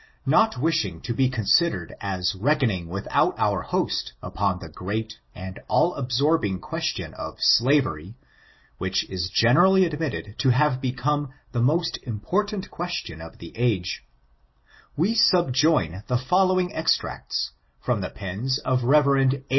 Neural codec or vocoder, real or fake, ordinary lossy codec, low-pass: none; real; MP3, 24 kbps; 7.2 kHz